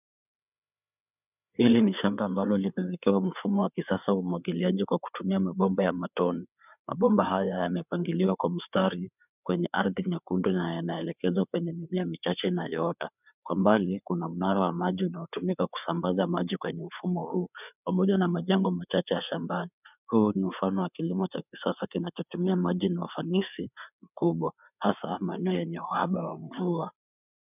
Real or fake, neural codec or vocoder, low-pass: fake; codec, 16 kHz, 4 kbps, FreqCodec, larger model; 3.6 kHz